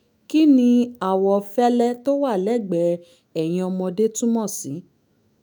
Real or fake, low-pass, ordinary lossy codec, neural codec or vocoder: fake; none; none; autoencoder, 48 kHz, 128 numbers a frame, DAC-VAE, trained on Japanese speech